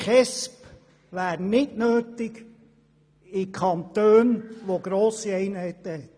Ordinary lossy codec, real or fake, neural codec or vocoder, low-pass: none; real; none; none